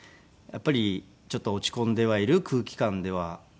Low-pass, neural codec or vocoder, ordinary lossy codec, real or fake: none; none; none; real